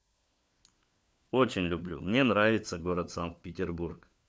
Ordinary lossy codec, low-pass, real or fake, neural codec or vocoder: none; none; fake; codec, 16 kHz, 4 kbps, FunCodec, trained on LibriTTS, 50 frames a second